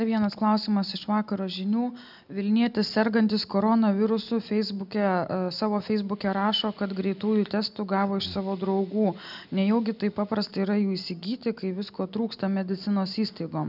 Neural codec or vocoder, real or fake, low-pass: none; real; 5.4 kHz